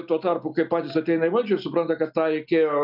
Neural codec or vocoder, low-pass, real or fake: none; 5.4 kHz; real